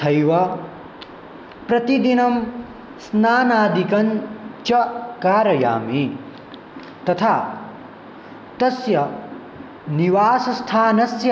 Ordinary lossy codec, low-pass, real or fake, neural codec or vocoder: none; none; real; none